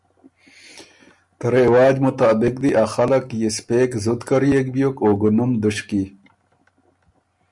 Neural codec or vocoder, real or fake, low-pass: none; real; 10.8 kHz